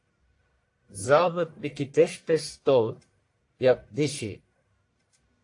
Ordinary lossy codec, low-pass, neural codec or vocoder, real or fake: AAC, 48 kbps; 10.8 kHz; codec, 44.1 kHz, 1.7 kbps, Pupu-Codec; fake